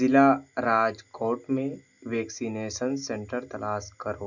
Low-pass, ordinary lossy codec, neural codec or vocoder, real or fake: 7.2 kHz; none; none; real